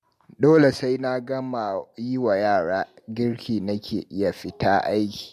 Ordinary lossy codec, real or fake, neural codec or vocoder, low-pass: MP3, 64 kbps; real; none; 14.4 kHz